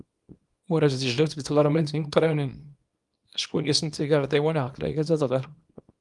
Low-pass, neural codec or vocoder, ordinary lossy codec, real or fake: 10.8 kHz; codec, 24 kHz, 0.9 kbps, WavTokenizer, small release; Opus, 32 kbps; fake